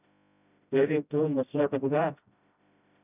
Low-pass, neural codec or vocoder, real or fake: 3.6 kHz; codec, 16 kHz, 0.5 kbps, FreqCodec, smaller model; fake